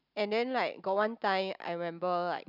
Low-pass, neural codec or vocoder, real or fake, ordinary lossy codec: 5.4 kHz; none; real; none